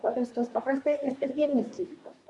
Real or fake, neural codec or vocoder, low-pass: fake; codec, 24 kHz, 1 kbps, SNAC; 10.8 kHz